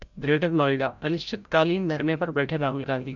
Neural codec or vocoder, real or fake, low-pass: codec, 16 kHz, 0.5 kbps, FreqCodec, larger model; fake; 7.2 kHz